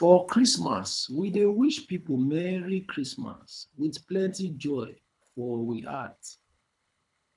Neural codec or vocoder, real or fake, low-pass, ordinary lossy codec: codec, 24 kHz, 3 kbps, HILCodec; fake; 10.8 kHz; none